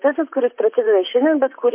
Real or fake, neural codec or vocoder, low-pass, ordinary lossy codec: fake; vocoder, 44.1 kHz, 128 mel bands, Pupu-Vocoder; 3.6 kHz; MP3, 24 kbps